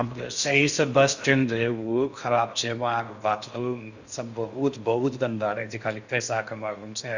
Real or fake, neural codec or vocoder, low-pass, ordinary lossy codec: fake; codec, 16 kHz in and 24 kHz out, 0.6 kbps, FocalCodec, streaming, 4096 codes; 7.2 kHz; Opus, 64 kbps